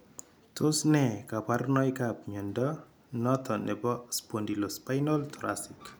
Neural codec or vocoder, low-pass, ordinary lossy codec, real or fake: none; none; none; real